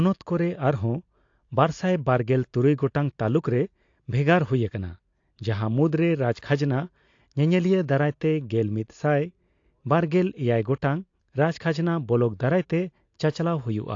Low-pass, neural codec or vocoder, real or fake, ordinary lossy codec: 7.2 kHz; none; real; AAC, 48 kbps